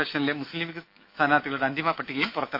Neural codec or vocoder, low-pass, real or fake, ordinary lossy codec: autoencoder, 48 kHz, 128 numbers a frame, DAC-VAE, trained on Japanese speech; 5.4 kHz; fake; MP3, 32 kbps